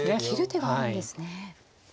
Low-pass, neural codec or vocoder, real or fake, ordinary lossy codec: none; none; real; none